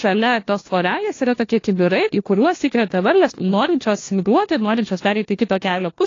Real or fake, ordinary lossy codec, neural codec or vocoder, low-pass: fake; AAC, 32 kbps; codec, 16 kHz, 1 kbps, FunCodec, trained on LibriTTS, 50 frames a second; 7.2 kHz